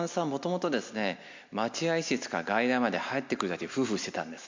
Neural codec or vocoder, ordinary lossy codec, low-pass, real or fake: none; MP3, 48 kbps; 7.2 kHz; real